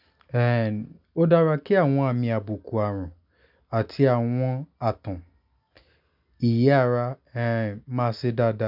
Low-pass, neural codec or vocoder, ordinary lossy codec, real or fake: 5.4 kHz; none; none; real